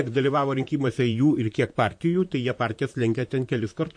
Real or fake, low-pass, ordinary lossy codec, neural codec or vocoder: fake; 9.9 kHz; MP3, 48 kbps; vocoder, 44.1 kHz, 128 mel bands, Pupu-Vocoder